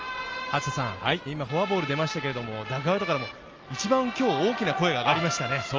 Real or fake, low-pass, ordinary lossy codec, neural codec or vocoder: real; 7.2 kHz; Opus, 24 kbps; none